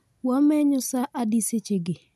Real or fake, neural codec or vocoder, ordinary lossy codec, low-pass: real; none; none; 14.4 kHz